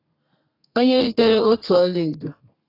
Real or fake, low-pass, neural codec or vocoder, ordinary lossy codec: fake; 5.4 kHz; codec, 32 kHz, 1.9 kbps, SNAC; AAC, 32 kbps